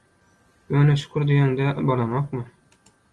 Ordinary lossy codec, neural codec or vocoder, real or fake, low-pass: Opus, 24 kbps; none; real; 10.8 kHz